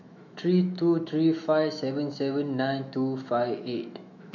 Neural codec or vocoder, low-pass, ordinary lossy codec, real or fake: autoencoder, 48 kHz, 128 numbers a frame, DAC-VAE, trained on Japanese speech; 7.2 kHz; none; fake